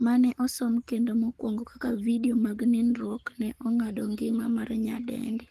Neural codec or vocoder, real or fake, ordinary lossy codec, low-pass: codec, 44.1 kHz, 7.8 kbps, Pupu-Codec; fake; Opus, 24 kbps; 14.4 kHz